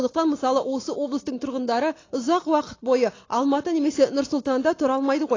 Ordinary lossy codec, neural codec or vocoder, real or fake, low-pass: AAC, 32 kbps; none; real; 7.2 kHz